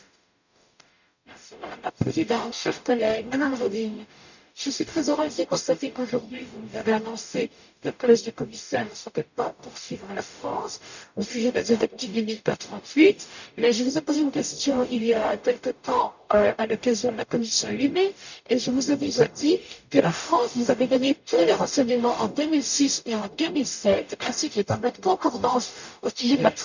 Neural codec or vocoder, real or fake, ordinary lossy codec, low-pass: codec, 44.1 kHz, 0.9 kbps, DAC; fake; none; 7.2 kHz